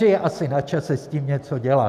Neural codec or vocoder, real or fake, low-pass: none; real; 14.4 kHz